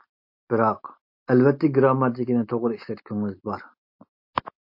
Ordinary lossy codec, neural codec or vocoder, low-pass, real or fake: MP3, 32 kbps; none; 5.4 kHz; real